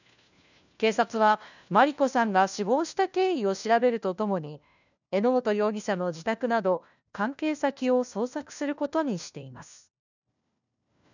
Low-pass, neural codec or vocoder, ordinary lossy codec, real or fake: 7.2 kHz; codec, 16 kHz, 1 kbps, FunCodec, trained on LibriTTS, 50 frames a second; none; fake